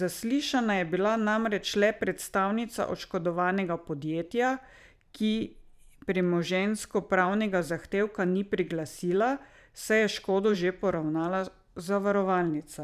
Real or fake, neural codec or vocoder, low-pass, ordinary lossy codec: fake; vocoder, 44.1 kHz, 128 mel bands every 512 samples, BigVGAN v2; 14.4 kHz; none